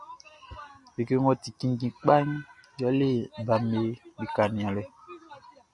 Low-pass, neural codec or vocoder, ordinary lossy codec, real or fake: 10.8 kHz; none; Opus, 64 kbps; real